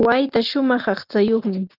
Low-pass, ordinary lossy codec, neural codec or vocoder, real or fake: 5.4 kHz; Opus, 24 kbps; none; real